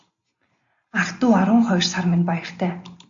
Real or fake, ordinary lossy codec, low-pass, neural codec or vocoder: real; AAC, 64 kbps; 7.2 kHz; none